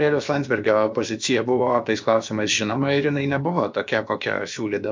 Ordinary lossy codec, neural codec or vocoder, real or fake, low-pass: MP3, 64 kbps; codec, 16 kHz, about 1 kbps, DyCAST, with the encoder's durations; fake; 7.2 kHz